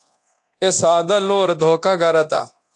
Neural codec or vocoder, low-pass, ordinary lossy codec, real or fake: codec, 24 kHz, 0.9 kbps, DualCodec; 10.8 kHz; AAC, 64 kbps; fake